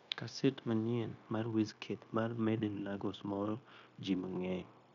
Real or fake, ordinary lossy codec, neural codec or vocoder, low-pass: fake; none; codec, 16 kHz, 0.9 kbps, LongCat-Audio-Codec; 7.2 kHz